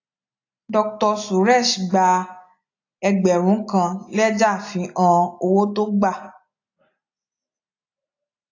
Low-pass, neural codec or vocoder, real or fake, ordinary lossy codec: 7.2 kHz; none; real; AAC, 48 kbps